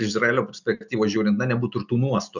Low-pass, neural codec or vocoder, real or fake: 7.2 kHz; none; real